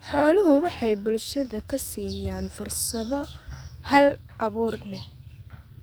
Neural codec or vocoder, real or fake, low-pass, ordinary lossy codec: codec, 44.1 kHz, 2.6 kbps, SNAC; fake; none; none